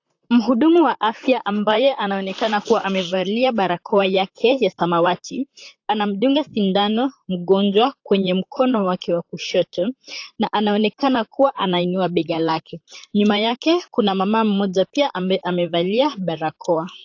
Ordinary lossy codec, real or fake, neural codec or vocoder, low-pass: AAC, 48 kbps; fake; vocoder, 44.1 kHz, 128 mel bands, Pupu-Vocoder; 7.2 kHz